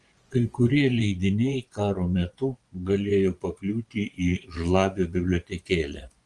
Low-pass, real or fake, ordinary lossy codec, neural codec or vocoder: 10.8 kHz; fake; Opus, 24 kbps; vocoder, 24 kHz, 100 mel bands, Vocos